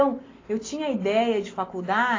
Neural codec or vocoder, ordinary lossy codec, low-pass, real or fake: none; AAC, 32 kbps; 7.2 kHz; real